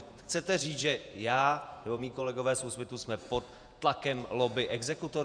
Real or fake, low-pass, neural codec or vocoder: fake; 9.9 kHz; vocoder, 48 kHz, 128 mel bands, Vocos